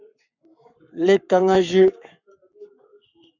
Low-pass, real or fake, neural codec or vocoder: 7.2 kHz; fake; codec, 44.1 kHz, 7.8 kbps, Pupu-Codec